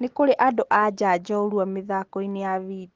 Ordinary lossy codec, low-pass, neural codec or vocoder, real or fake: Opus, 16 kbps; 7.2 kHz; none; real